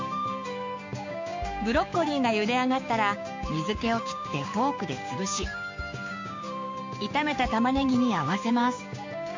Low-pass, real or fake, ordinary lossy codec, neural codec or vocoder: 7.2 kHz; fake; MP3, 48 kbps; codec, 16 kHz, 6 kbps, DAC